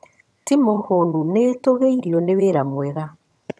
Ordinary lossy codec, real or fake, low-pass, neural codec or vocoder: none; fake; none; vocoder, 22.05 kHz, 80 mel bands, HiFi-GAN